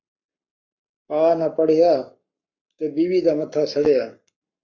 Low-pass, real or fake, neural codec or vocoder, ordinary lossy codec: 7.2 kHz; fake; codec, 44.1 kHz, 7.8 kbps, Pupu-Codec; MP3, 64 kbps